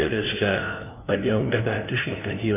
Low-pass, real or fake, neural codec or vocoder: 3.6 kHz; fake; codec, 16 kHz, 0.5 kbps, FunCodec, trained on LibriTTS, 25 frames a second